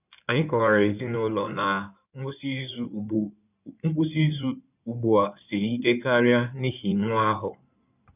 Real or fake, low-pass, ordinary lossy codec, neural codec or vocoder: fake; 3.6 kHz; none; codec, 16 kHz in and 24 kHz out, 2.2 kbps, FireRedTTS-2 codec